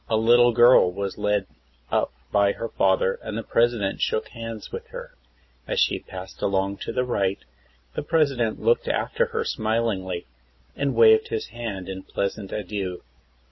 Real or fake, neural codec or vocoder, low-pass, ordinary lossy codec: real; none; 7.2 kHz; MP3, 24 kbps